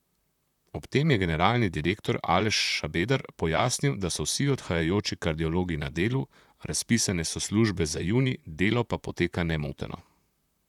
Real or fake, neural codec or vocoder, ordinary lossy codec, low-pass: fake; vocoder, 44.1 kHz, 128 mel bands, Pupu-Vocoder; none; 19.8 kHz